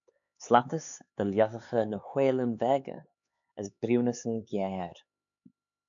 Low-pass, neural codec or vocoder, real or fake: 7.2 kHz; codec, 16 kHz, 4 kbps, X-Codec, HuBERT features, trained on LibriSpeech; fake